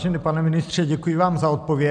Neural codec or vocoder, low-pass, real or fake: none; 9.9 kHz; real